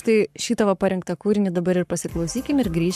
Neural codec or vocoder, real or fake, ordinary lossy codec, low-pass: codec, 44.1 kHz, 7.8 kbps, Pupu-Codec; fake; AAC, 96 kbps; 14.4 kHz